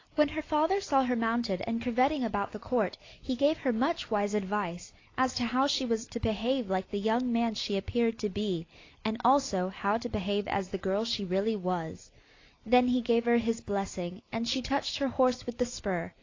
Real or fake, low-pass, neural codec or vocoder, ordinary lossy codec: real; 7.2 kHz; none; AAC, 32 kbps